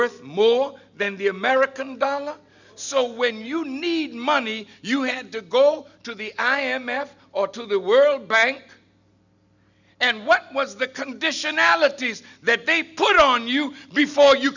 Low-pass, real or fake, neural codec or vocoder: 7.2 kHz; real; none